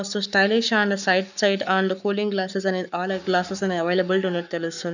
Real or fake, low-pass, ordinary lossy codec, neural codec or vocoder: fake; 7.2 kHz; none; codec, 44.1 kHz, 7.8 kbps, Pupu-Codec